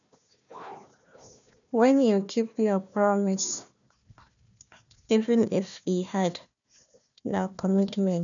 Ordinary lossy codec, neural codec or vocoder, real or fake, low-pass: none; codec, 16 kHz, 1 kbps, FunCodec, trained on Chinese and English, 50 frames a second; fake; 7.2 kHz